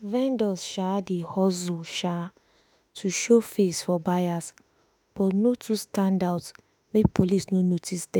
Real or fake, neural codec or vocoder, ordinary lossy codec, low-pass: fake; autoencoder, 48 kHz, 32 numbers a frame, DAC-VAE, trained on Japanese speech; none; none